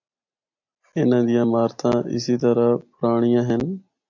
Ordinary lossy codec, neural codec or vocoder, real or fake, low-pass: AAC, 48 kbps; none; real; 7.2 kHz